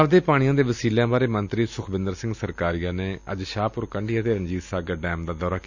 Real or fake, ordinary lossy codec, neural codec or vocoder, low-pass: real; none; none; 7.2 kHz